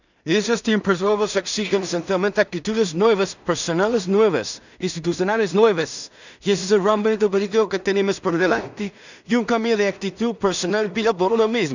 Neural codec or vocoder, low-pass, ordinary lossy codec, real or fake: codec, 16 kHz in and 24 kHz out, 0.4 kbps, LongCat-Audio-Codec, two codebook decoder; 7.2 kHz; none; fake